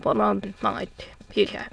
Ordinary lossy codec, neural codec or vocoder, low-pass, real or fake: none; autoencoder, 22.05 kHz, a latent of 192 numbers a frame, VITS, trained on many speakers; none; fake